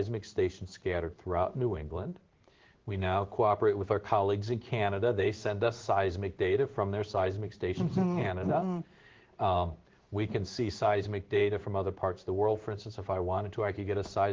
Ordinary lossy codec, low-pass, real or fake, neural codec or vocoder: Opus, 16 kbps; 7.2 kHz; fake; codec, 16 kHz in and 24 kHz out, 1 kbps, XY-Tokenizer